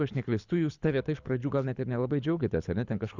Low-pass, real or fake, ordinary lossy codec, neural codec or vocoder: 7.2 kHz; fake; Opus, 64 kbps; codec, 24 kHz, 6 kbps, HILCodec